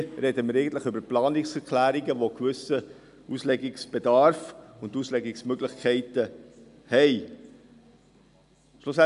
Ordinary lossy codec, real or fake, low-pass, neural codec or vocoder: none; real; 10.8 kHz; none